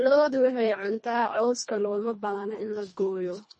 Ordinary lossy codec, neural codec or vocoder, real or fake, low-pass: MP3, 32 kbps; codec, 24 kHz, 1.5 kbps, HILCodec; fake; 10.8 kHz